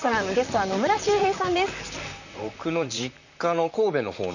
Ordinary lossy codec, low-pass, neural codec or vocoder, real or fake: none; 7.2 kHz; vocoder, 44.1 kHz, 128 mel bands, Pupu-Vocoder; fake